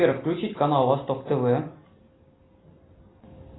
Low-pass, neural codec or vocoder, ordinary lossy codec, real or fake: 7.2 kHz; none; AAC, 16 kbps; real